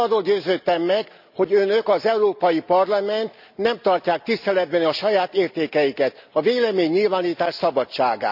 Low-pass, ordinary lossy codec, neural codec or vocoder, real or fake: 5.4 kHz; none; none; real